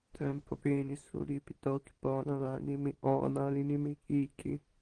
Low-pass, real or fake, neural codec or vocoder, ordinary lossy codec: 9.9 kHz; real; none; Opus, 16 kbps